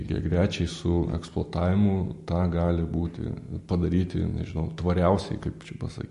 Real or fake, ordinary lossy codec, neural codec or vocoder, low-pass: real; MP3, 48 kbps; none; 14.4 kHz